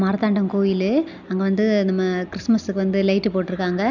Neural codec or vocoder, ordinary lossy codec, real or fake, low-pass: none; none; real; 7.2 kHz